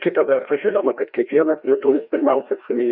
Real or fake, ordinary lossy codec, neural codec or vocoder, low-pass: fake; Opus, 64 kbps; codec, 16 kHz, 1 kbps, FreqCodec, larger model; 5.4 kHz